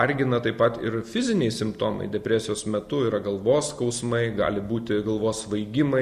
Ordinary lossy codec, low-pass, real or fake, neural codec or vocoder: Opus, 64 kbps; 14.4 kHz; real; none